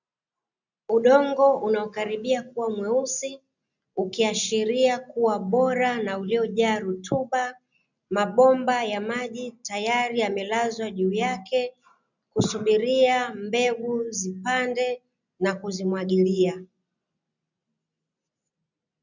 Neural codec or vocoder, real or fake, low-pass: none; real; 7.2 kHz